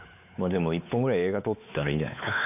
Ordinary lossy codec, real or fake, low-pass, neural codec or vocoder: none; fake; 3.6 kHz; codec, 16 kHz, 4 kbps, X-Codec, WavLM features, trained on Multilingual LibriSpeech